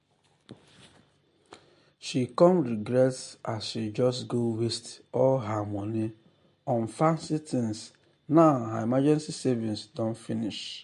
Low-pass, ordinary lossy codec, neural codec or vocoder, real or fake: 14.4 kHz; MP3, 48 kbps; none; real